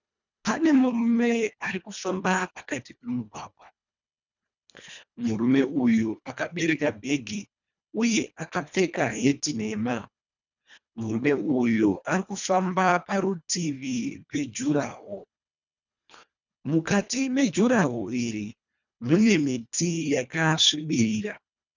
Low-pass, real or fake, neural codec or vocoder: 7.2 kHz; fake; codec, 24 kHz, 1.5 kbps, HILCodec